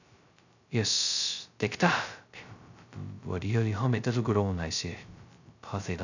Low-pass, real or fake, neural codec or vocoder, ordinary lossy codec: 7.2 kHz; fake; codec, 16 kHz, 0.2 kbps, FocalCodec; none